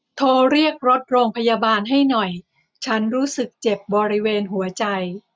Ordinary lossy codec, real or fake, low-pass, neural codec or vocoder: none; real; none; none